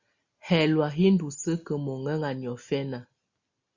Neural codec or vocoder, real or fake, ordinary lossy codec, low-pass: none; real; Opus, 64 kbps; 7.2 kHz